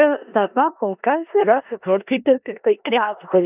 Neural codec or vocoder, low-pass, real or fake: codec, 16 kHz in and 24 kHz out, 0.4 kbps, LongCat-Audio-Codec, four codebook decoder; 3.6 kHz; fake